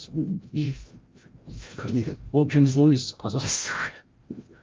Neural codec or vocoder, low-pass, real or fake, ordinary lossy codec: codec, 16 kHz, 0.5 kbps, FreqCodec, larger model; 7.2 kHz; fake; Opus, 32 kbps